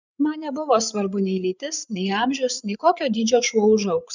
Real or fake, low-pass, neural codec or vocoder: fake; 7.2 kHz; codec, 16 kHz, 8 kbps, FreqCodec, larger model